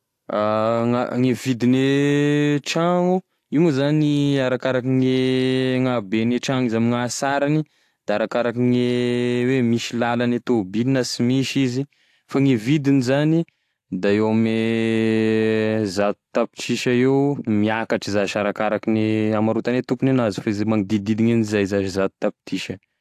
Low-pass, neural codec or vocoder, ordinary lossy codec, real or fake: 14.4 kHz; none; AAC, 64 kbps; real